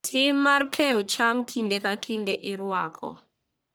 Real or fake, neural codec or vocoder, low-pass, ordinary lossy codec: fake; codec, 44.1 kHz, 1.7 kbps, Pupu-Codec; none; none